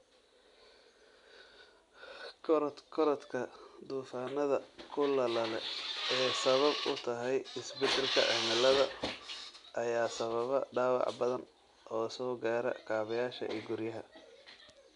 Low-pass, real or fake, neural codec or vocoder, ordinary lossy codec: 10.8 kHz; real; none; none